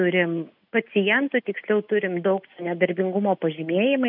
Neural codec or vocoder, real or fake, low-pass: none; real; 5.4 kHz